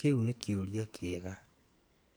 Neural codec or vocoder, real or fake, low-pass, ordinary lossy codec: codec, 44.1 kHz, 2.6 kbps, SNAC; fake; none; none